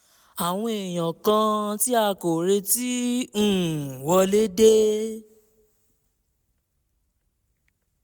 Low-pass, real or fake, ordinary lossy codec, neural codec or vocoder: none; real; none; none